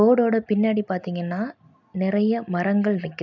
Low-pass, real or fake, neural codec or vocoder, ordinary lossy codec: 7.2 kHz; real; none; none